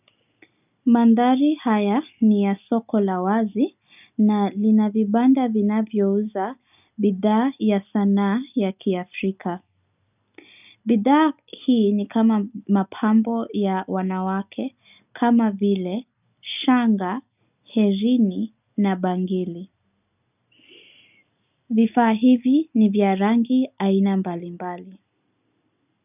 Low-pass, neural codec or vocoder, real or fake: 3.6 kHz; none; real